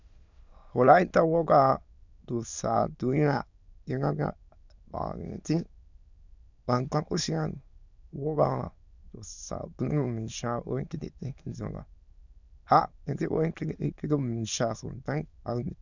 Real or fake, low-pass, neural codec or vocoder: fake; 7.2 kHz; autoencoder, 22.05 kHz, a latent of 192 numbers a frame, VITS, trained on many speakers